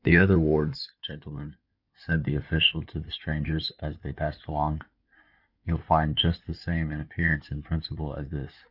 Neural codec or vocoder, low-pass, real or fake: codec, 16 kHz in and 24 kHz out, 2.2 kbps, FireRedTTS-2 codec; 5.4 kHz; fake